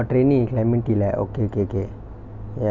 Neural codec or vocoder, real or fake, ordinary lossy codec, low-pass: none; real; none; 7.2 kHz